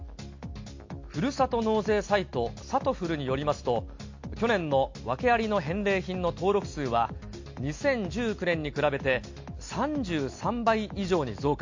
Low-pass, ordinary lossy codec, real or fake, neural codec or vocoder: 7.2 kHz; MP3, 48 kbps; real; none